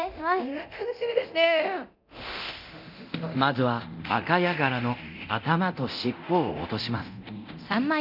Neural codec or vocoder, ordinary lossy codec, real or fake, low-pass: codec, 24 kHz, 0.9 kbps, DualCodec; none; fake; 5.4 kHz